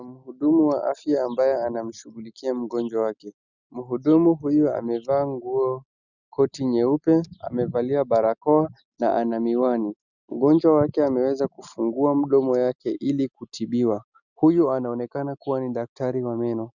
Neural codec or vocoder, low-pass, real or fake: none; 7.2 kHz; real